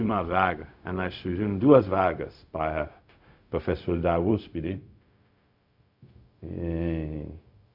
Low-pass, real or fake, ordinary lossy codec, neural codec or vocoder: 5.4 kHz; fake; none; codec, 16 kHz, 0.4 kbps, LongCat-Audio-Codec